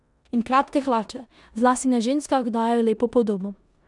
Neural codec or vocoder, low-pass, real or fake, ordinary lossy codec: codec, 16 kHz in and 24 kHz out, 0.9 kbps, LongCat-Audio-Codec, four codebook decoder; 10.8 kHz; fake; none